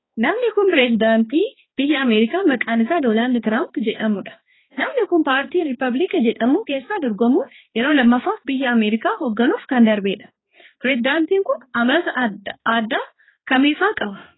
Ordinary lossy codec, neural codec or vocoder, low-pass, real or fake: AAC, 16 kbps; codec, 16 kHz, 2 kbps, X-Codec, HuBERT features, trained on balanced general audio; 7.2 kHz; fake